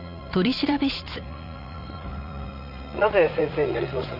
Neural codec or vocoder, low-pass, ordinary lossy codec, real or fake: vocoder, 44.1 kHz, 80 mel bands, Vocos; 5.4 kHz; none; fake